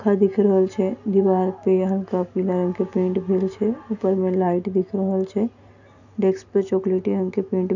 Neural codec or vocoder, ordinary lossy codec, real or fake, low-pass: none; none; real; 7.2 kHz